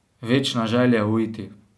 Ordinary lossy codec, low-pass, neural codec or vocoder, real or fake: none; none; none; real